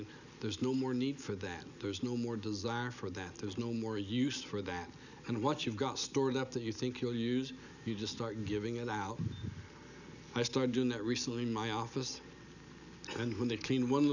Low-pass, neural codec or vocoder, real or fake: 7.2 kHz; none; real